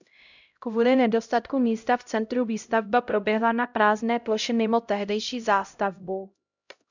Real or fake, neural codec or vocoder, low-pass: fake; codec, 16 kHz, 0.5 kbps, X-Codec, HuBERT features, trained on LibriSpeech; 7.2 kHz